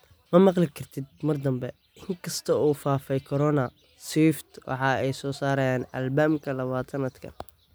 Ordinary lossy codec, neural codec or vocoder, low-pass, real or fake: none; none; none; real